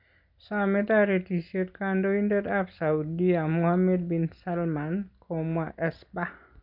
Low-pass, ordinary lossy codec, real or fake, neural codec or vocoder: 5.4 kHz; none; real; none